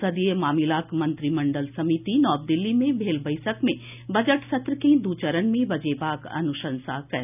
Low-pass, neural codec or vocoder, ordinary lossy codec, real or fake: 3.6 kHz; none; none; real